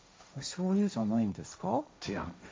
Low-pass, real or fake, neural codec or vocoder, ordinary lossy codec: none; fake; codec, 16 kHz, 1.1 kbps, Voila-Tokenizer; none